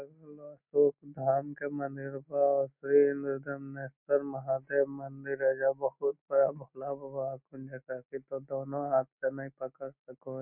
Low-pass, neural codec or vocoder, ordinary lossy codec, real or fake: 3.6 kHz; none; none; real